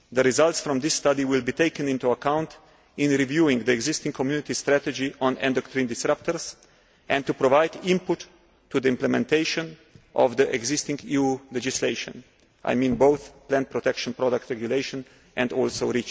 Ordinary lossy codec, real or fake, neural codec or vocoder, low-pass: none; real; none; none